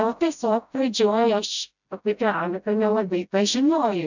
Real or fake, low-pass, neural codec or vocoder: fake; 7.2 kHz; codec, 16 kHz, 0.5 kbps, FreqCodec, smaller model